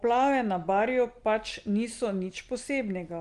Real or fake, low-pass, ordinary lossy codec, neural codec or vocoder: real; 10.8 kHz; Opus, 24 kbps; none